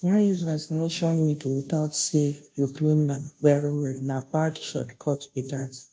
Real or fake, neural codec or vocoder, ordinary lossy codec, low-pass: fake; codec, 16 kHz, 0.5 kbps, FunCodec, trained on Chinese and English, 25 frames a second; none; none